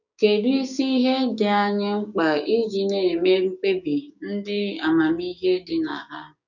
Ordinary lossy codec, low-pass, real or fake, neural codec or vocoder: none; 7.2 kHz; fake; codec, 16 kHz, 6 kbps, DAC